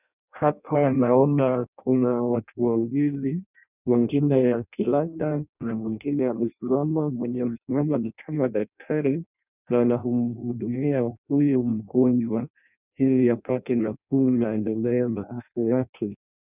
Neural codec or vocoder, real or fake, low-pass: codec, 16 kHz in and 24 kHz out, 0.6 kbps, FireRedTTS-2 codec; fake; 3.6 kHz